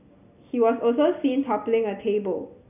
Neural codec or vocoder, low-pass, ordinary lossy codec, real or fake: none; 3.6 kHz; none; real